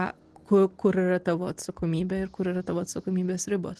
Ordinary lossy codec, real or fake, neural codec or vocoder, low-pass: Opus, 16 kbps; real; none; 10.8 kHz